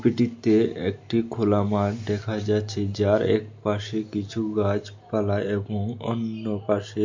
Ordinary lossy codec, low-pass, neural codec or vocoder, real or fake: MP3, 48 kbps; 7.2 kHz; none; real